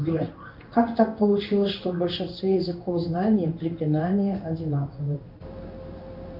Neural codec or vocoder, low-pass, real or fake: codec, 16 kHz in and 24 kHz out, 1 kbps, XY-Tokenizer; 5.4 kHz; fake